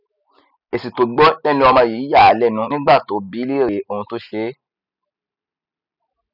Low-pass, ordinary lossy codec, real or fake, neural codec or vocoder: 5.4 kHz; none; real; none